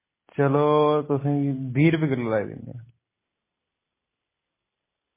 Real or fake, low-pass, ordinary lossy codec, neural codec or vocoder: real; 3.6 kHz; MP3, 16 kbps; none